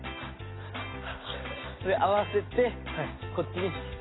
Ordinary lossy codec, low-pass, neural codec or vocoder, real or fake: AAC, 16 kbps; 7.2 kHz; none; real